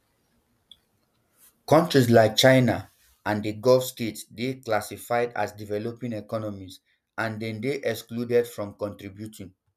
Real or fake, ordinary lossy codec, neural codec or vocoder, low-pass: real; none; none; 14.4 kHz